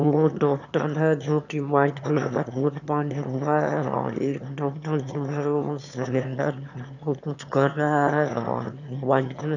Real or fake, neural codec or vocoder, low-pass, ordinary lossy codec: fake; autoencoder, 22.05 kHz, a latent of 192 numbers a frame, VITS, trained on one speaker; 7.2 kHz; none